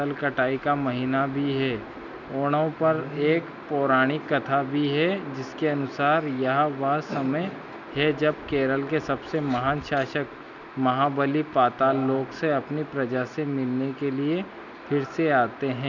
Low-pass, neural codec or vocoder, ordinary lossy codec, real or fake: 7.2 kHz; none; none; real